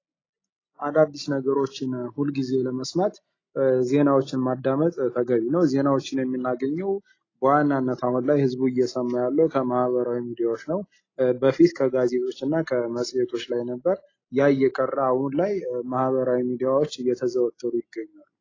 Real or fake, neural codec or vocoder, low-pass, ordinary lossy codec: real; none; 7.2 kHz; AAC, 32 kbps